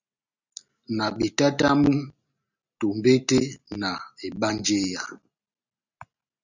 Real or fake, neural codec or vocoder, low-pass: real; none; 7.2 kHz